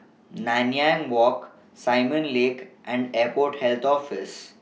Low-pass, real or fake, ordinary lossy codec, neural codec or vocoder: none; real; none; none